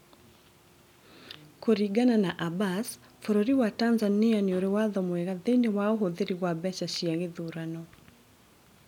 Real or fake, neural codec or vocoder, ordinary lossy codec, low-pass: real; none; none; 19.8 kHz